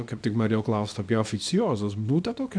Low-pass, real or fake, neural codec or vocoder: 9.9 kHz; fake; codec, 24 kHz, 0.9 kbps, WavTokenizer, small release